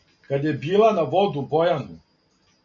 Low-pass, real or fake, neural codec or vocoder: 7.2 kHz; real; none